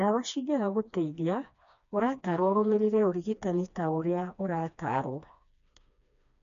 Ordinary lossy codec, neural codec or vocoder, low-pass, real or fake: none; codec, 16 kHz, 2 kbps, FreqCodec, smaller model; 7.2 kHz; fake